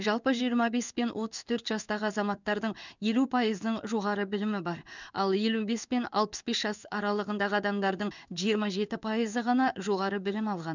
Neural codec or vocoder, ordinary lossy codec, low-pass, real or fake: codec, 16 kHz in and 24 kHz out, 1 kbps, XY-Tokenizer; none; 7.2 kHz; fake